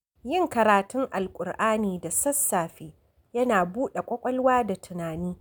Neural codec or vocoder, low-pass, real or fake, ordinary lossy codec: none; none; real; none